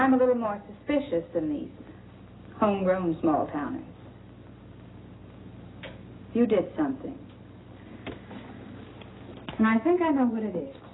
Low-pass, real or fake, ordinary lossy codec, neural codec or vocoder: 7.2 kHz; real; AAC, 16 kbps; none